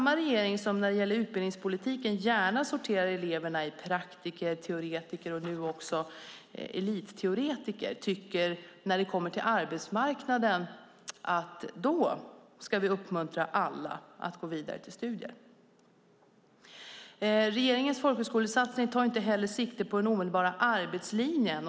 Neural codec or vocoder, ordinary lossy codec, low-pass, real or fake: none; none; none; real